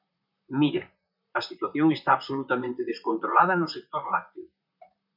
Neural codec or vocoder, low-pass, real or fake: vocoder, 44.1 kHz, 128 mel bands, Pupu-Vocoder; 5.4 kHz; fake